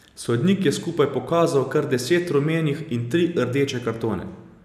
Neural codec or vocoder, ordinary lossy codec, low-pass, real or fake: none; none; 14.4 kHz; real